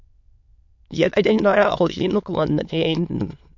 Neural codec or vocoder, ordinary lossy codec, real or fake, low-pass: autoencoder, 22.05 kHz, a latent of 192 numbers a frame, VITS, trained on many speakers; MP3, 48 kbps; fake; 7.2 kHz